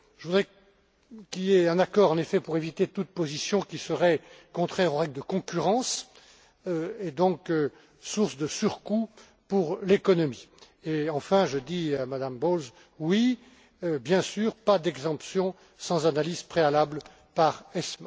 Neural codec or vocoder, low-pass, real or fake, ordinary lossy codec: none; none; real; none